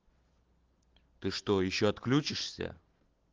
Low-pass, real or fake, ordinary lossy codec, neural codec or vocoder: 7.2 kHz; real; Opus, 16 kbps; none